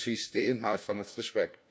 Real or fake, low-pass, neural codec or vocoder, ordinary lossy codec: fake; none; codec, 16 kHz, 1 kbps, FunCodec, trained on LibriTTS, 50 frames a second; none